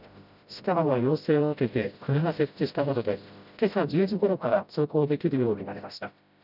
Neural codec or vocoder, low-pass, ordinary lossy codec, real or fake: codec, 16 kHz, 0.5 kbps, FreqCodec, smaller model; 5.4 kHz; none; fake